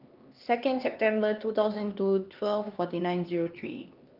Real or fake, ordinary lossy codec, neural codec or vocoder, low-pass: fake; Opus, 24 kbps; codec, 16 kHz, 2 kbps, X-Codec, HuBERT features, trained on LibriSpeech; 5.4 kHz